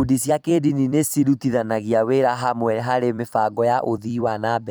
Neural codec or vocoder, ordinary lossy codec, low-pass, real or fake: vocoder, 44.1 kHz, 128 mel bands every 256 samples, BigVGAN v2; none; none; fake